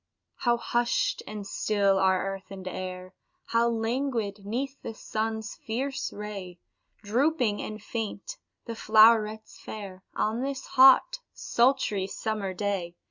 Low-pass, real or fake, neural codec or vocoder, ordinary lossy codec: 7.2 kHz; real; none; Opus, 64 kbps